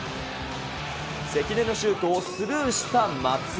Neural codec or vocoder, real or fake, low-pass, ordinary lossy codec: none; real; none; none